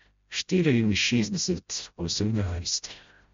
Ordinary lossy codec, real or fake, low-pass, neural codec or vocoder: MP3, 48 kbps; fake; 7.2 kHz; codec, 16 kHz, 0.5 kbps, FreqCodec, smaller model